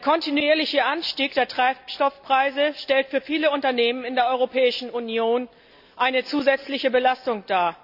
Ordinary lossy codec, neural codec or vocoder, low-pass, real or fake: none; none; 5.4 kHz; real